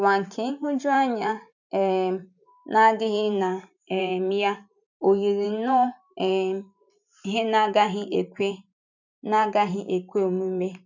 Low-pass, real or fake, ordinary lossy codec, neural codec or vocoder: 7.2 kHz; fake; none; vocoder, 44.1 kHz, 128 mel bands every 512 samples, BigVGAN v2